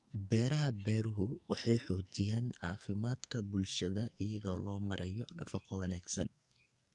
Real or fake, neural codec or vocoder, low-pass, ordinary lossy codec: fake; codec, 44.1 kHz, 2.6 kbps, SNAC; 10.8 kHz; none